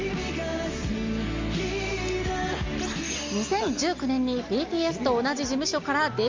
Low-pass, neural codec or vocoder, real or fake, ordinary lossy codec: 7.2 kHz; none; real; Opus, 32 kbps